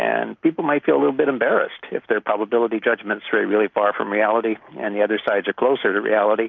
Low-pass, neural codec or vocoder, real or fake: 7.2 kHz; none; real